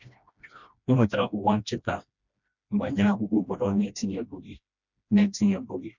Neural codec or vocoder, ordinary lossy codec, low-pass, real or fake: codec, 16 kHz, 1 kbps, FreqCodec, smaller model; none; 7.2 kHz; fake